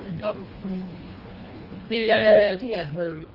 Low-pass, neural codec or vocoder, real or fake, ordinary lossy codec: 5.4 kHz; codec, 24 kHz, 1.5 kbps, HILCodec; fake; Opus, 24 kbps